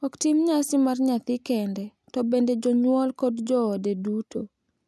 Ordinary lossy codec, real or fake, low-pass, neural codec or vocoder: none; real; none; none